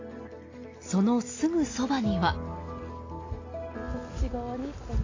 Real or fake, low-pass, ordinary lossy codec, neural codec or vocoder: real; 7.2 kHz; AAC, 32 kbps; none